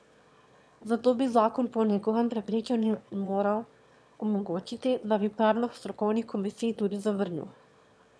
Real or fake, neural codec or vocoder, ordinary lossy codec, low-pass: fake; autoencoder, 22.05 kHz, a latent of 192 numbers a frame, VITS, trained on one speaker; none; none